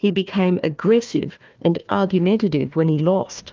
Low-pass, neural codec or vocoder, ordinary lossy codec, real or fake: 7.2 kHz; codec, 16 kHz, 1 kbps, FunCodec, trained on Chinese and English, 50 frames a second; Opus, 24 kbps; fake